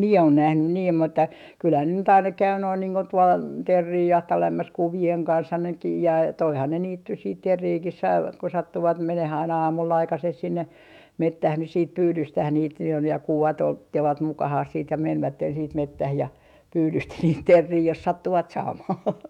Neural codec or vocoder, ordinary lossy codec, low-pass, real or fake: autoencoder, 48 kHz, 128 numbers a frame, DAC-VAE, trained on Japanese speech; none; 19.8 kHz; fake